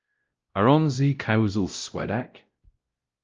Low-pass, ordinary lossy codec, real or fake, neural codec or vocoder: 7.2 kHz; Opus, 24 kbps; fake; codec, 16 kHz, 0.5 kbps, X-Codec, WavLM features, trained on Multilingual LibriSpeech